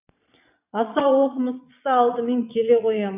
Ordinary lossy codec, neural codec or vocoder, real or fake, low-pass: none; vocoder, 22.05 kHz, 80 mel bands, Vocos; fake; 3.6 kHz